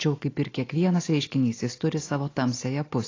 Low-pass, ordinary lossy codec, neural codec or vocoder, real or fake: 7.2 kHz; AAC, 32 kbps; none; real